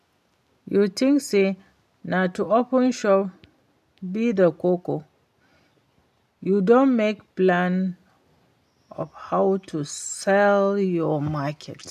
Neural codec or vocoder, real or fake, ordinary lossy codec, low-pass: none; real; none; 14.4 kHz